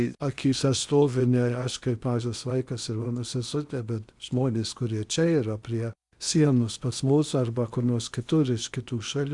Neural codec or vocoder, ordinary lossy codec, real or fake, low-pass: codec, 16 kHz in and 24 kHz out, 0.8 kbps, FocalCodec, streaming, 65536 codes; Opus, 64 kbps; fake; 10.8 kHz